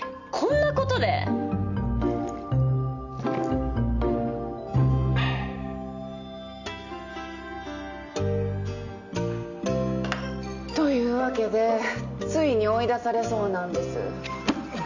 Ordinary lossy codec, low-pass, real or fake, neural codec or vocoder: none; 7.2 kHz; real; none